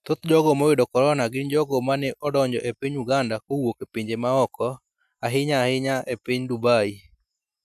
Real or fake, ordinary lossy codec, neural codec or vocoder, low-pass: real; none; none; 14.4 kHz